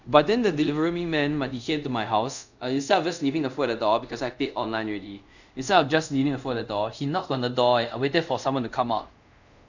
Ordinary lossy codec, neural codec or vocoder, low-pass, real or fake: none; codec, 24 kHz, 0.5 kbps, DualCodec; 7.2 kHz; fake